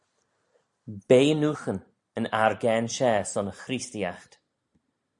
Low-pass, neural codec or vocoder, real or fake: 10.8 kHz; none; real